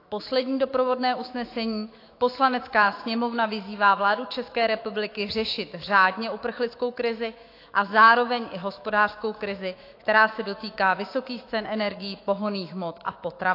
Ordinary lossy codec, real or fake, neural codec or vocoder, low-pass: AAC, 32 kbps; fake; autoencoder, 48 kHz, 128 numbers a frame, DAC-VAE, trained on Japanese speech; 5.4 kHz